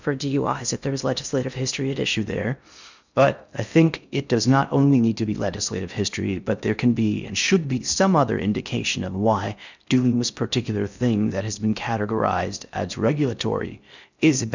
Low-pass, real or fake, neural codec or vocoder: 7.2 kHz; fake; codec, 16 kHz in and 24 kHz out, 0.6 kbps, FocalCodec, streaming, 2048 codes